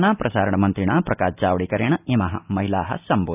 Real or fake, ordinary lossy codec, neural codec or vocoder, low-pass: real; none; none; 3.6 kHz